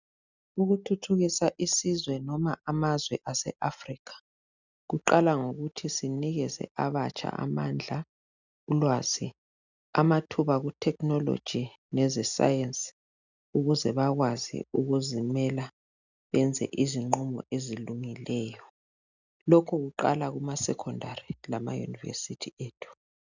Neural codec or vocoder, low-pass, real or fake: none; 7.2 kHz; real